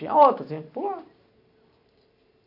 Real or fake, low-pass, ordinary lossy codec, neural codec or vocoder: real; 5.4 kHz; AAC, 48 kbps; none